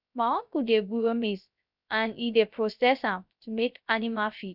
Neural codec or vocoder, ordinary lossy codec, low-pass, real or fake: codec, 16 kHz, 0.3 kbps, FocalCodec; none; 5.4 kHz; fake